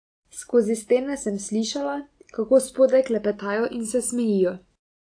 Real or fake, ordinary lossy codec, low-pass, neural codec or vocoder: real; AAC, 48 kbps; 9.9 kHz; none